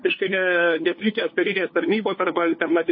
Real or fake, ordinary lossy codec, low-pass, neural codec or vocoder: fake; MP3, 24 kbps; 7.2 kHz; codec, 16 kHz, 2 kbps, FunCodec, trained on LibriTTS, 25 frames a second